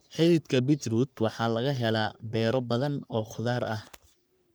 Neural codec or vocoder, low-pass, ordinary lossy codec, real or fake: codec, 44.1 kHz, 3.4 kbps, Pupu-Codec; none; none; fake